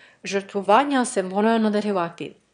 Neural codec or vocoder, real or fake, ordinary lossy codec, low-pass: autoencoder, 22.05 kHz, a latent of 192 numbers a frame, VITS, trained on one speaker; fake; none; 9.9 kHz